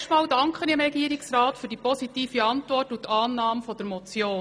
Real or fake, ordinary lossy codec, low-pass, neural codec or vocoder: real; none; none; none